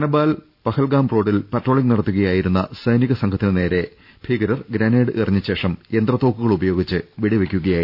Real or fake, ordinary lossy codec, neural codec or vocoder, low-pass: real; none; none; 5.4 kHz